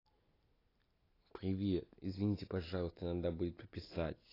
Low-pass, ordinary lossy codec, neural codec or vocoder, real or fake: 5.4 kHz; AAC, 24 kbps; none; real